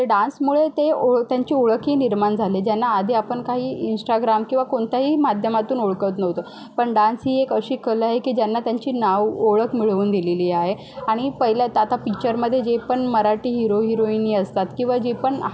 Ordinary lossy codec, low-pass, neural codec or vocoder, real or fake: none; none; none; real